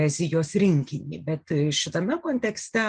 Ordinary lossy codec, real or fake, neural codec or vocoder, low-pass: Opus, 24 kbps; real; none; 9.9 kHz